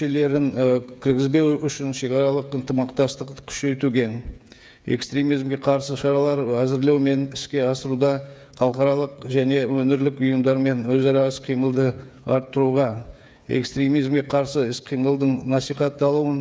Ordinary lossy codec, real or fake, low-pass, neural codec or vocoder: none; fake; none; codec, 16 kHz, 8 kbps, FreqCodec, smaller model